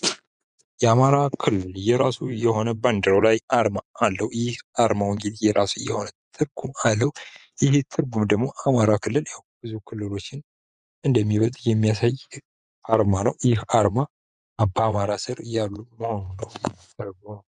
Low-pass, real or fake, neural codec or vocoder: 10.8 kHz; fake; vocoder, 24 kHz, 100 mel bands, Vocos